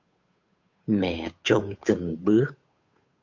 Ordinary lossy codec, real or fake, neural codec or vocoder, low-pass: MP3, 48 kbps; fake; codec, 16 kHz, 8 kbps, FunCodec, trained on Chinese and English, 25 frames a second; 7.2 kHz